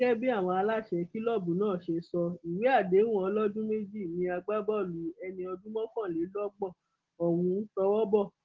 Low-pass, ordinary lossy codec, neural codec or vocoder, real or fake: 7.2 kHz; Opus, 16 kbps; none; real